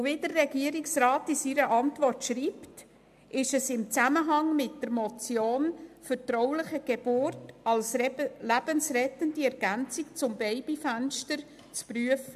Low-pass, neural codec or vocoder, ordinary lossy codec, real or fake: 14.4 kHz; none; AAC, 96 kbps; real